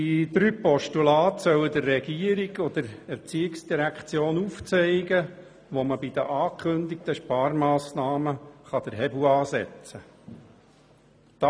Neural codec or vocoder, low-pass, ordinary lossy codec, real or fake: none; none; none; real